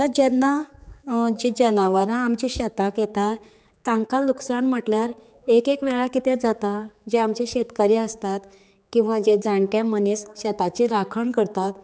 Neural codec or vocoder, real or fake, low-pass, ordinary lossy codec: codec, 16 kHz, 4 kbps, X-Codec, HuBERT features, trained on general audio; fake; none; none